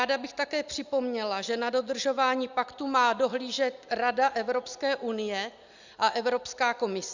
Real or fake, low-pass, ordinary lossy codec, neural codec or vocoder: real; 7.2 kHz; Opus, 64 kbps; none